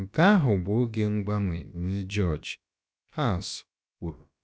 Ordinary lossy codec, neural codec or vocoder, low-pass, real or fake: none; codec, 16 kHz, about 1 kbps, DyCAST, with the encoder's durations; none; fake